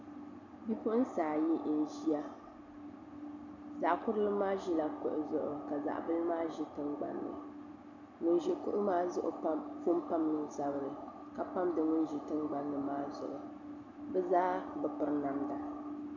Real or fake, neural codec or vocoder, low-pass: real; none; 7.2 kHz